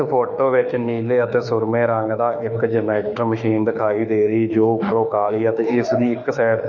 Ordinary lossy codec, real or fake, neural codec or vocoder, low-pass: none; fake; autoencoder, 48 kHz, 32 numbers a frame, DAC-VAE, trained on Japanese speech; 7.2 kHz